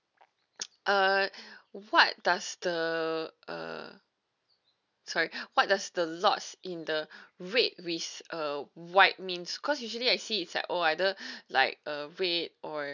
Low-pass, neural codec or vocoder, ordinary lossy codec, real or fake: 7.2 kHz; none; none; real